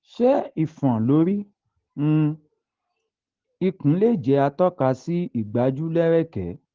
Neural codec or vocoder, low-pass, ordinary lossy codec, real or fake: none; 7.2 kHz; Opus, 16 kbps; real